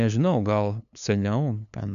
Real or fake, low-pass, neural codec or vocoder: fake; 7.2 kHz; codec, 16 kHz, 2 kbps, FunCodec, trained on LibriTTS, 25 frames a second